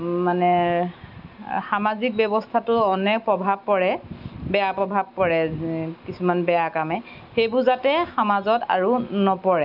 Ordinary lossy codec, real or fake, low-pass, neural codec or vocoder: none; real; 5.4 kHz; none